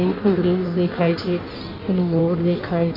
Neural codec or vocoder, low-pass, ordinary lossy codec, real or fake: codec, 16 kHz in and 24 kHz out, 1.1 kbps, FireRedTTS-2 codec; 5.4 kHz; none; fake